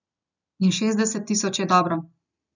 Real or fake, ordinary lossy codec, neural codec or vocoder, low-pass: real; none; none; 7.2 kHz